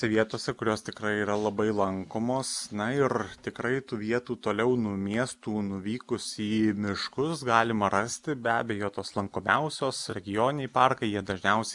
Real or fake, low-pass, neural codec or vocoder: real; 10.8 kHz; none